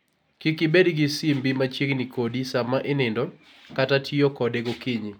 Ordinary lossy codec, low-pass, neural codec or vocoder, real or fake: none; 19.8 kHz; none; real